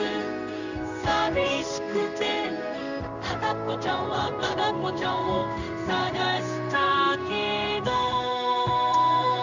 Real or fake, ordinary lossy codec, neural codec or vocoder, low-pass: fake; none; codec, 16 kHz in and 24 kHz out, 1 kbps, XY-Tokenizer; 7.2 kHz